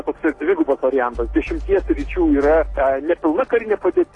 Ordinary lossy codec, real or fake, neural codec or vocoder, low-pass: AAC, 32 kbps; real; none; 10.8 kHz